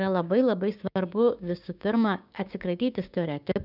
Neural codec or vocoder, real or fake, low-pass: codec, 16 kHz, 6 kbps, DAC; fake; 5.4 kHz